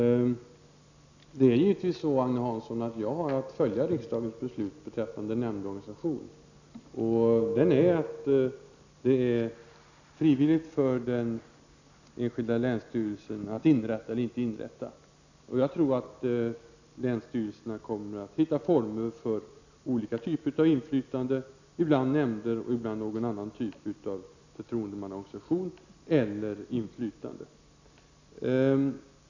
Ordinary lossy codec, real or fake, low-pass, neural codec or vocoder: none; real; 7.2 kHz; none